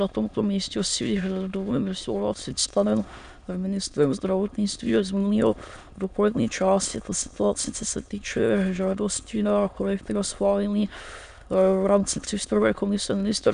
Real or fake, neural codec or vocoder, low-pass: fake; autoencoder, 22.05 kHz, a latent of 192 numbers a frame, VITS, trained on many speakers; 9.9 kHz